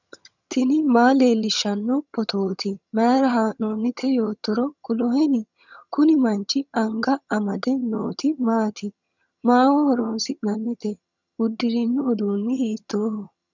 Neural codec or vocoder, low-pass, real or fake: vocoder, 22.05 kHz, 80 mel bands, HiFi-GAN; 7.2 kHz; fake